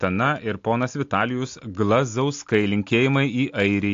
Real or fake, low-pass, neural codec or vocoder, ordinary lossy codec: real; 7.2 kHz; none; AAC, 48 kbps